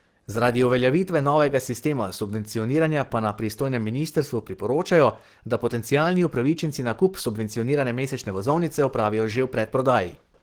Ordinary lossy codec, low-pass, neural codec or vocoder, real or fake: Opus, 16 kbps; 19.8 kHz; codec, 44.1 kHz, 7.8 kbps, DAC; fake